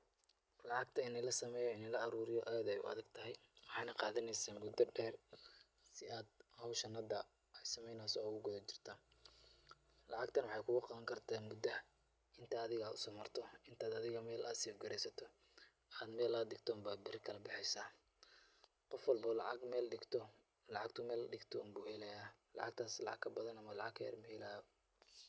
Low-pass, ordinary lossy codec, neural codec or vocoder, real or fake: none; none; none; real